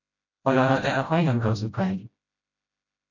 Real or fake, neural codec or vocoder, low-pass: fake; codec, 16 kHz, 0.5 kbps, FreqCodec, smaller model; 7.2 kHz